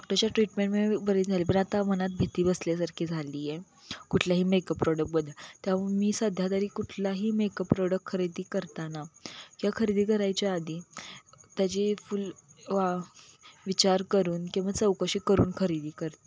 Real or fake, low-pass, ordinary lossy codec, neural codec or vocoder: real; none; none; none